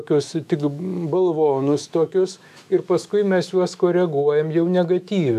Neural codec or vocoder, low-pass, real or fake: autoencoder, 48 kHz, 128 numbers a frame, DAC-VAE, trained on Japanese speech; 14.4 kHz; fake